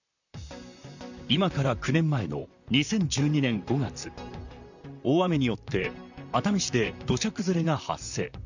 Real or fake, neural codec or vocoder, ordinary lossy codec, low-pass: fake; vocoder, 44.1 kHz, 128 mel bands, Pupu-Vocoder; none; 7.2 kHz